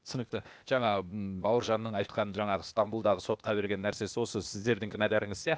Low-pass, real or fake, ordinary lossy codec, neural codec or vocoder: none; fake; none; codec, 16 kHz, 0.8 kbps, ZipCodec